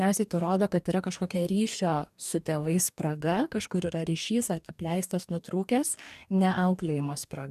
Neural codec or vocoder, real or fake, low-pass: codec, 44.1 kHz, 2.6 kbps, DAC; fake; 14.4 kHz